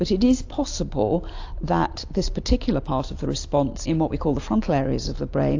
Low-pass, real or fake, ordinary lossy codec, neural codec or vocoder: 7.2 kHz; real; AAC, 48 kbps; none